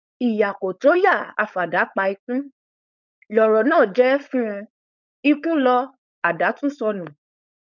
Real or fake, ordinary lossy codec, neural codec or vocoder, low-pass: fake; none; codec, 16 kHz, 4.8 kbps, FACodec; 7.2 kHz